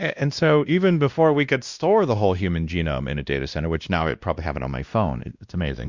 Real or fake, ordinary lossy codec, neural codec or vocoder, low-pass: fake; Opus, 64 kbps; codec, 16 kHz, 1 kbps, X-Codec, WavLM features, trained on Multilingual LibriSpeech; 7.2 kHz